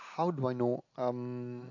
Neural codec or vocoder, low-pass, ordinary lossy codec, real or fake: none; 7.2 kHz; AAC, 48 kbps; real